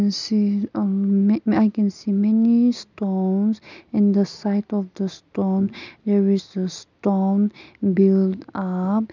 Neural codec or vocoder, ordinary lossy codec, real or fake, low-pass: none; none; real; 7.2 kHz